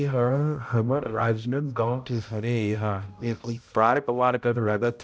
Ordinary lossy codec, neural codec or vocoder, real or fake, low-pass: none; codec, 16 kHz, 0.5 kbps, X-Codec, HuBERT features, trained on balanced general audio; fake; none